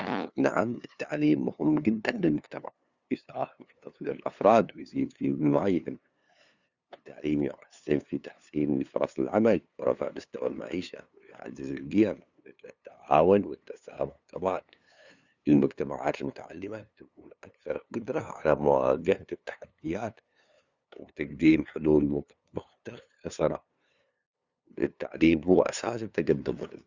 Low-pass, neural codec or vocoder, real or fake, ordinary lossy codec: 7.2 kHz; codec, 16 kHz, 2 kbps, FunCodec, trained on LibriTTS, 25 frames a second; fake; Opus, 64 kbps